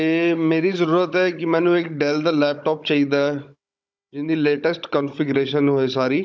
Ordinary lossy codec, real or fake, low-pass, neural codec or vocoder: none; fake; none; codec, 16 kHz, 16 kbps, FunCodec, trained on Chinese and English, 50 frames a second